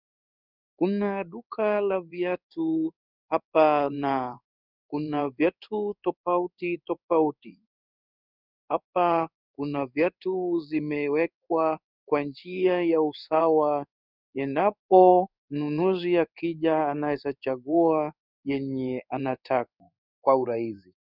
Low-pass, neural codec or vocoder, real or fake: 5.4 kHz; codec, 16 kHz in and 24 kHz out, 1 kbps, XY-Tokenizer; fake